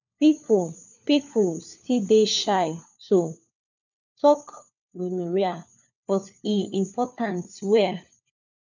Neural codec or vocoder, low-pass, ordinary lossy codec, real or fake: codec, 16 kHz, 4 kbps, FunCodec, trained on LibriTTS, 50 frames a second; 7.2 kHz; none; fake